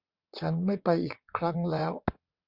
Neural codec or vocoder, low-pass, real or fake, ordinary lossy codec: vocoder, 22.05 kHz, 80 mel bands, WaveNeXt; 5.4 kHz; fake; Opus, 64 kbps